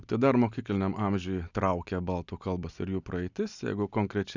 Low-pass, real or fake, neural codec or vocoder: 7.2 kHz; real; none